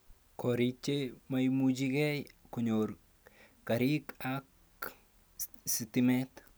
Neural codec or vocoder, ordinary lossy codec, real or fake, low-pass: none; none; real; none